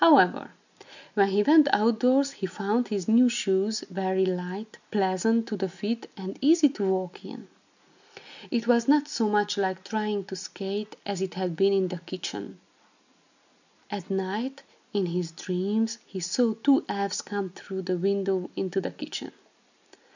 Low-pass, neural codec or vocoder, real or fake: 7.2 kHz; none; real